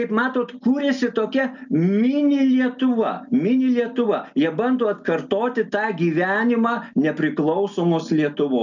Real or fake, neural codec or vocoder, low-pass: real; none; 7.2 kHz